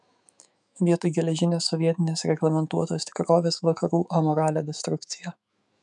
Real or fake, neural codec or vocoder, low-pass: fake; autoencoder, 48 kHz, 128 numbers a frame, DAC-VAE, trained on Japanese speech; 10.8 kHz